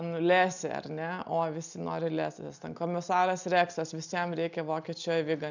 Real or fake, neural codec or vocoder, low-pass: real; none; 7.2 kHz